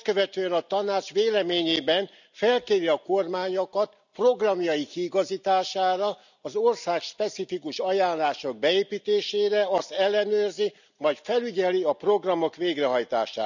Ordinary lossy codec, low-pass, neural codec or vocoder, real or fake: none; 7.2 kHz; none; real